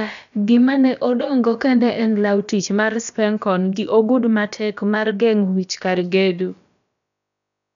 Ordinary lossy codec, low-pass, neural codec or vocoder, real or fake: none; 7.2 kHz; codec, 16 kHz, about 1 kbps, DyCAST, with the encoder's durations; fake